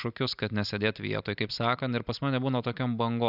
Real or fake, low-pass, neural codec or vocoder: real; 5.4 kHz; none